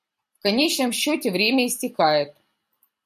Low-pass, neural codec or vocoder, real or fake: 14.4 kHz; vocoder, 44.1 kHz, 128 mel bands every 256 samples, BigVGAN v2; fake